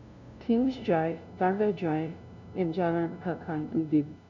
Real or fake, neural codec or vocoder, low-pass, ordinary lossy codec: fake; codec, 16 kHz, 0.5 kbps, FunCodec, trained on LibriTTS, 25 frames a second; 7.2 kHz; none